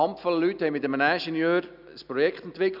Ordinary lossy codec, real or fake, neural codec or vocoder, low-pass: none; real; none; 5.4 kHz